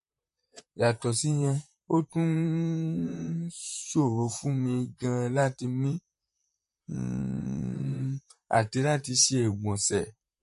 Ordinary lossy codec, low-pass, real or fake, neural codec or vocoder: MP3, 48 kbps; 14.4 kHz; fake; vocoder, 44.1 kHz, 128 mel bands, Pupu-Vocoder